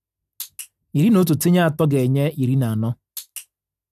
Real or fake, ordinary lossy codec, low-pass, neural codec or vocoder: real; none; 14.4 kHz; none